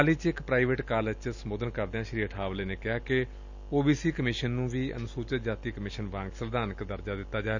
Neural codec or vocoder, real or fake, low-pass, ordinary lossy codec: none; real; 7.2 kHz; none